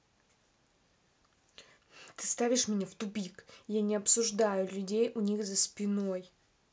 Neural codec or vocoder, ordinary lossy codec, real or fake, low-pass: none; none; real; none